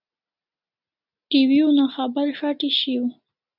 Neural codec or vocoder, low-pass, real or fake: none; 5.4 kHz; real